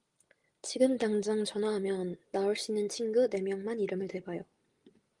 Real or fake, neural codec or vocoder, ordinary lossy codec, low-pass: real; none; Opus, 24 kbps; 10.8 kHz